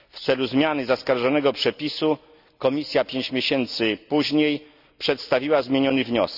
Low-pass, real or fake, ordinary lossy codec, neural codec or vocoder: 5.4 kHz; real; none; none